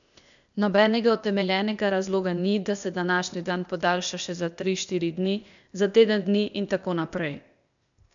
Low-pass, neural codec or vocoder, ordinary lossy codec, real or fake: 7.2 kHz; codec, 16 kHz, 0.8 kbps, ZipCodec; none; fake